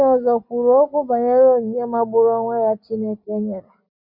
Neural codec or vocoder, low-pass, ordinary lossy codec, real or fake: none; 5.4 kHz; none; real